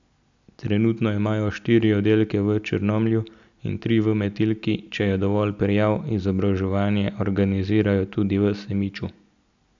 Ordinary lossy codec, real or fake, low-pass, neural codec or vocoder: none; real; 7.2 kHz; none